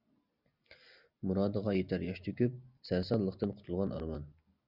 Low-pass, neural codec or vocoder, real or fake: 5.4 kHz; none; real